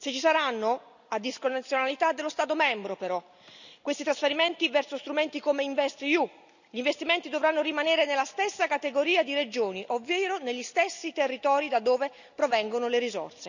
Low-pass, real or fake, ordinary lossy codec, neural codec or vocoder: 7.2 kHz; real; none; none